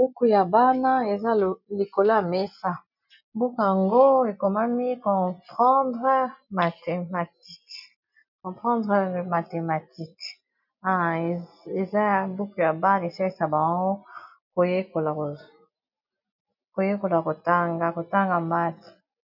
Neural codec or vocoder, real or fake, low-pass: none; real; 5.4 kHz